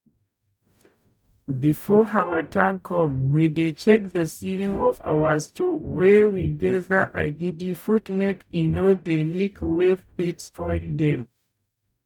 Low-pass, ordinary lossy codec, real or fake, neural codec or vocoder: 19.8 kHz; none; fake; codec, 44.1 kHz, 0.9 kbps, DAC